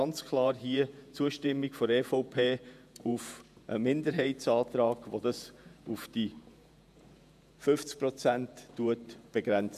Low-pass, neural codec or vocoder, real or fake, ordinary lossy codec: 14.4 kHz; vocoder, 48 kHz, 128 mel bands, Vocos; fake; none